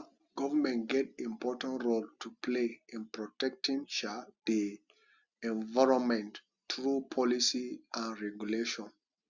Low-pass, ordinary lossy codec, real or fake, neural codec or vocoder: 7.2 kHz; Opus, 64 kbps; real; none